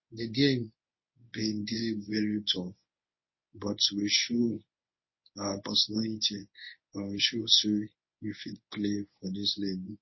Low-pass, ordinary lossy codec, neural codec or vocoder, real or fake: 7.2 kHz; MP3, 24 kbps; codec, 24 kHz, 0.9 kbps, WavTokenizer, medium speech release version 1; fake